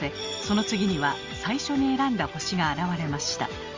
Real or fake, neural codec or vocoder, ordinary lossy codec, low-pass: real; none; Opus, 32 kbps; 7.2 kHz